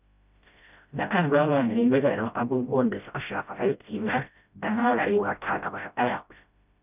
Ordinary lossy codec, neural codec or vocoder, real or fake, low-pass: none; codec, 16 kHz, 0.5 kbps, FreqCodec, smaller model; fake; 3.6 kHz